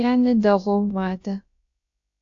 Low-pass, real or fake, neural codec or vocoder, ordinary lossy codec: 7.2 kHz; fake; codec, 16 kHz, about 1 kbps, DyCAST, with the encoder's durations; AAC, 48 kbps